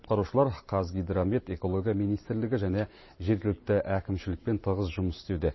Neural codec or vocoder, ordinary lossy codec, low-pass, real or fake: vocoder, 44.1 kHz, 128 mel bands every 512 samples, BigVGAN v2; MP3, 24 kbps; 7.2 kHz; fake